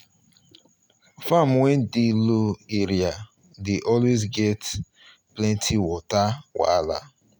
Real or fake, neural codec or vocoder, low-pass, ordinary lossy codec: real; none; none; none